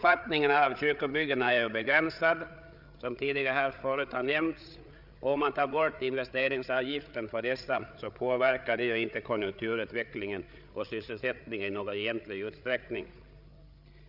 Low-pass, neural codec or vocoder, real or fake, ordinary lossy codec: 5.4 kHz; codec, 16 kHz, 8 kbps, FreqCodec, larger model; fake; none